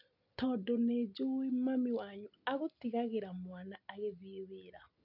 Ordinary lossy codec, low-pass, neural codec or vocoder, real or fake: none; 5.4 kHz; none; real